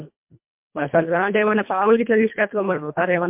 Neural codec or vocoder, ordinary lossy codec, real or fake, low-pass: codec, 24 kHz, 1.5 kbps, HILCodec; MP3, 32 kbps; fake; 3.6 kHz